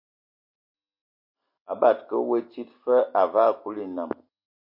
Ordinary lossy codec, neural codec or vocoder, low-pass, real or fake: MP3, 32 kbps; none; 5.4 kHz; real